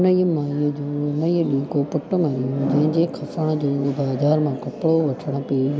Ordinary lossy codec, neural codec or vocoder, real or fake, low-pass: none; none; real; none